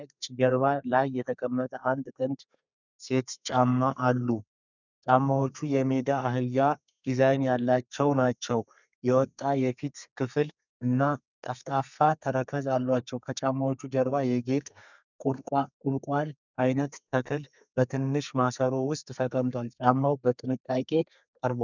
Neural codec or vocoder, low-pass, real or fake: codec, 32 kHz, 1.9 kbps, SNAC; 7.2 kHz; fake